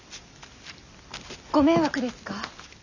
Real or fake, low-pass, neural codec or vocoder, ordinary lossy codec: real; 7.2 kHz; none; none